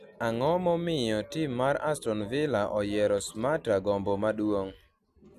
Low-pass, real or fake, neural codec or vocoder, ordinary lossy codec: 14.4 kHz; real; none; none